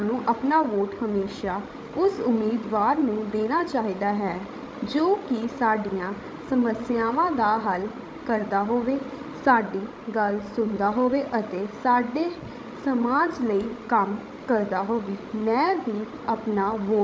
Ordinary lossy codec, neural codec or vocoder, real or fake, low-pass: none; codec, 16 kHz, 16 kbps, FreqCodec, larger model; fake; none